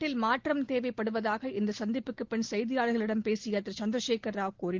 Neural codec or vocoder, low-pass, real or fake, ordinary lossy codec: none; 7.2 kHz; real; Opus, 16 kbps